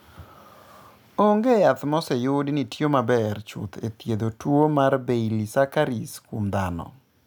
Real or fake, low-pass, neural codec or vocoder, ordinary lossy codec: real; none; none; none